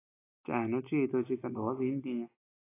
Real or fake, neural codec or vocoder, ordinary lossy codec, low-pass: real; none; AAC, 16 kbps; 3.6 kHz